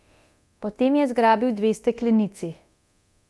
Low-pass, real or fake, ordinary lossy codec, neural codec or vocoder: none; fake; none; codec, 24 kHz, 0.9 kbps, DualCodec